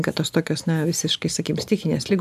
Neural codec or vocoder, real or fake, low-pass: none; real; 14.4 kHz